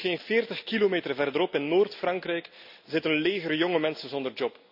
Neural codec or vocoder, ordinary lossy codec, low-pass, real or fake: none; none; 5.4 kHz; real